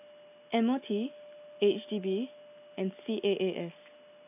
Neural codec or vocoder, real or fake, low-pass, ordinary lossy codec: none; real; 3.6 kHz; AAC, 32 kbps